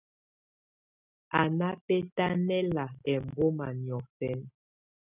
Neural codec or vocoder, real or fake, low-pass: none; real; 3.6 kHz